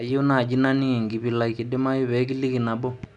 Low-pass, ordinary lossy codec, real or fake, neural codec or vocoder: 10.8 kHz; none; real; none